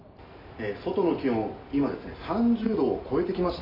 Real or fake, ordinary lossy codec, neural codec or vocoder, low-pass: real; AAC, 24 kbps; none; 5.4 kHz